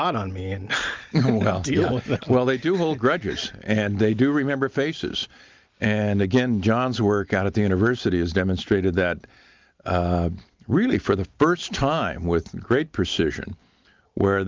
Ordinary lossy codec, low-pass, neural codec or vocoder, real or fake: Opus, 32 kbps; 7.2 kHz; none; real